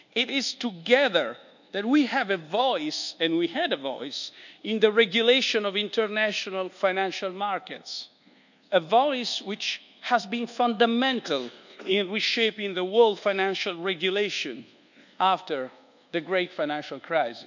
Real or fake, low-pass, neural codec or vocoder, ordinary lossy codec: fake; 7.2 kHz; codec, 24 kHz, 1.2 kbps, DualCodec; none